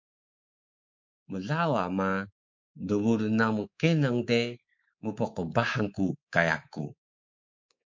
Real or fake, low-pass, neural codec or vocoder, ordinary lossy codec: fake; 7.2 kHz; codec, 24 kHz, 3.1 kbps, DualCodec; MP3, 48 kbps